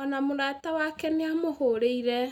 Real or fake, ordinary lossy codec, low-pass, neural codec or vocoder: real; none; 19.8 kHz; none